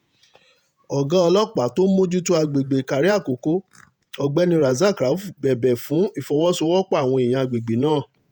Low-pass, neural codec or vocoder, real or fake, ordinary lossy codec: none; vocoder, 48 kHz, 128 mel bands, Vocos; fake; none